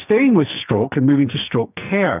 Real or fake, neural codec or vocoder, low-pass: fake; codec, 44.1 kHz, 2.6 kbps, SNAC; 3.6 kHz